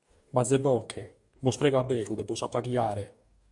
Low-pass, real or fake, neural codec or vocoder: 10.8 kHz; fake; codec, 44.1 kHz, 2.6 kbps, DAC